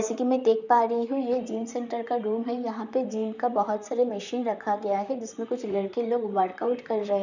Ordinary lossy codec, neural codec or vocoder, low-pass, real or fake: none; vocoder, 44.1 kHz, 128 mel bands, Pupu-Vocoder; 7.2 kHz; fake